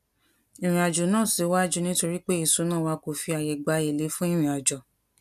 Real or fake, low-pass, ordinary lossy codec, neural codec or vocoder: real; 14.4 kHz; none; none